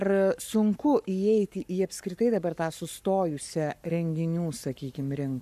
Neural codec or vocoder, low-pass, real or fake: codec, 44.1 kHz, 7.8 kbps, Pupu-Codec; 14.4 kHz; fake